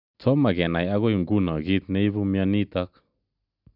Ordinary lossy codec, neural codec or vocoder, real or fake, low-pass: none; none; real; 5.4 kHz